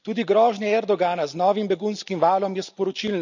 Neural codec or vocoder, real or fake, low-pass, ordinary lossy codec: none; real; 7.2 kHz; none